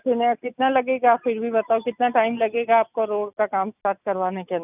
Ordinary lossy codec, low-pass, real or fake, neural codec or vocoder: none; 3.6 kHz; real; none